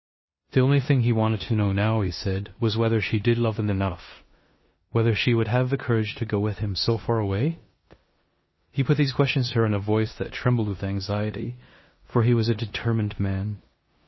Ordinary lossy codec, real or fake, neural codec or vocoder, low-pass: MP3, 24 kbps; fake; codec, 16 kHz in and 24 kHz out, 0.9 kbps, LongCat-Audio-Codec, four codebook decoder; 7.2 kHz